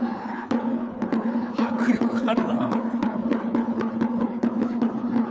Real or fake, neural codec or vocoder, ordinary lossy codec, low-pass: fake; codec, 16 kHz, 4 kbps, FreqCodec, larger model; none; none